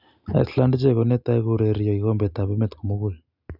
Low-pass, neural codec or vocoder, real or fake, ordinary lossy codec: 5.4 kHz; none; real; none